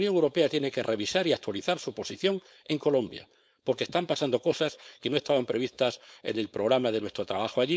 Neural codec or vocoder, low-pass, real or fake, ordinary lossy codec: codec, 16 kHz, 4.8 kbps, FACodec; none; fake; none